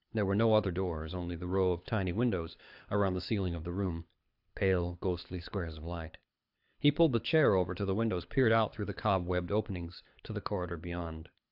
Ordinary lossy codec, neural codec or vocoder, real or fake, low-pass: AAC, 48 kbps; codec, 24 kHz, 6 kbps, HILCodec; fake; 5.4 kHz